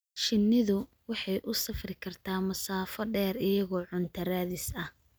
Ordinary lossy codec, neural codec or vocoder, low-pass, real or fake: none; none; none; real